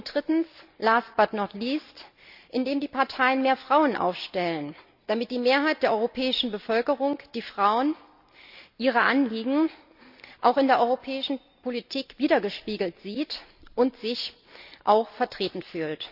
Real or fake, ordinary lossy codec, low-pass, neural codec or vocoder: real; none; 5.4 kHz; none